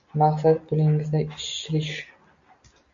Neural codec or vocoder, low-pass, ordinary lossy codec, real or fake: none; 7.2 kHz; AAC, 64 kbps; real